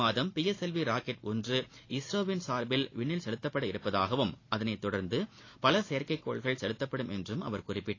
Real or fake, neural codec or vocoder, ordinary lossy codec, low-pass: real; none; AAC, 32 kbps; 7.2 kHz